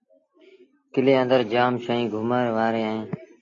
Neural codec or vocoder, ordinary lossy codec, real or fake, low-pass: none; AAC, 32 kbps; real; 7.2 kHz